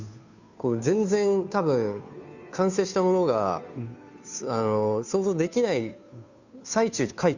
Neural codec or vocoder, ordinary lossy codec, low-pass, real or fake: codec, 16 kHz, 2 kbps, FunCodec, trained on Chinese and English, 25 frames a second; none; 7.2 kHz; fake